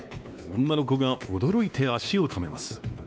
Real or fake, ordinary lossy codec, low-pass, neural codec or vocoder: fake; none; none; codec, 16 kHz, 2 kbps, X-Codec, WavLM features, trained on Multilingual LibriSpeech